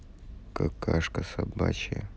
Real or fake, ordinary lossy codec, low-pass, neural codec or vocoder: real; none; none; none